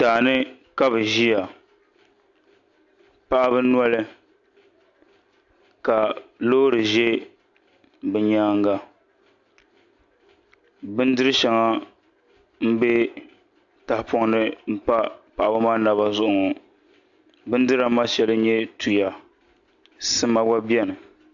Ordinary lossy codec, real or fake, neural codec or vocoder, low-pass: AAC, 64 kbps; real; none; 7.2 kHz